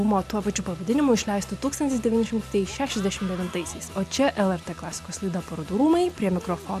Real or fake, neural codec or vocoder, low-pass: real; none; 14.4 kHz